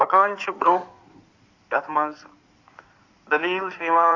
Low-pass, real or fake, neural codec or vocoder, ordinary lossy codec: 7.2 kHz; fake; codec, 16 kHz in and 24 kHz out, 2.2 kbps, FireRedTTS-2 codec; AAC, 48 kbps